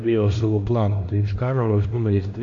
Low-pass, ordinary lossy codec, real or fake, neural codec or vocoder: 7.2 kHz; AAC, 48 kbps; fake; codec, 16 kHz, 1 kbps, X-Codec, HuBERT features, trained on LibriSpeech